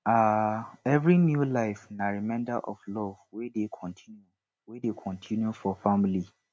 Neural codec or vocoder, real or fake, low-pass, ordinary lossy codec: none; real; none; none